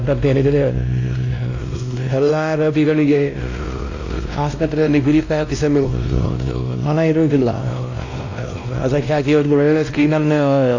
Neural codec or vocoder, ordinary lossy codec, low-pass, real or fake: codec, 16 kHz, 1 kbps, X-Codec, WavLM features, trained on Multilingual LibriSpeech; AAC, 48 kbps; 7.2 kHz; fake